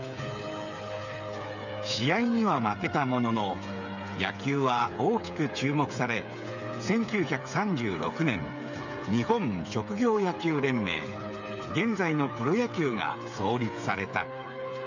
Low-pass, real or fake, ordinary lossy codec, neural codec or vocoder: 7.2 kHz; fake; none; codec, 16 kHz, 8 kbps, FreqCodec, smaller model